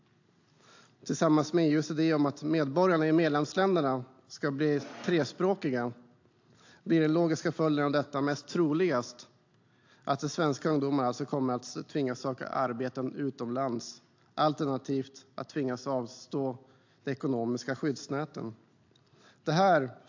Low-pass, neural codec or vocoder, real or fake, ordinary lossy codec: 7.2 kHz; none; real; AAC, 48 kbps